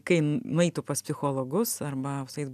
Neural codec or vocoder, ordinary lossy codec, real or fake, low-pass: none; AAC, 96 kbps; real; 14.4 kHz